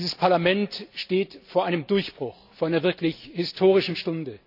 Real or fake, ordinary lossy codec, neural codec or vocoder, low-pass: real; none; none; 5.4 kHz